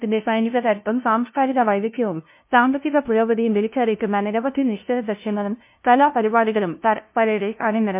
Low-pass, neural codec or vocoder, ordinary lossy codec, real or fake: 3.6 kHz; codec, 16 kHz, 0.5 kbps, FunCodec, trained on LibriTTS, 25 frames a second; MP3, 32 kbps; fake